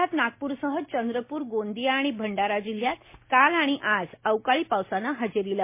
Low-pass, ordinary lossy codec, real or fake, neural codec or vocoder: 3.6 kHz; MP3, 24 kbps; real; none